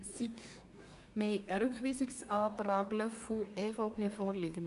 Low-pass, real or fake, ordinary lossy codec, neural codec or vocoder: 10.8 kHz; fake; none; codec, 24 kHz, 1 kbps, SNAC